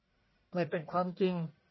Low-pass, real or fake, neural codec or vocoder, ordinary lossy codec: 7.2 kHz; fake; codec, 44.1 kHz, 1.7 kbps, Pupu-Codec; MP3, 24 kbps